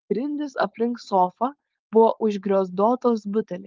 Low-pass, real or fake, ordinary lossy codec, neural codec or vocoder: 7.2 kHz; fake; Opus, 32 kbps; codec, 16 kHz, 4.8 kbps, FACodec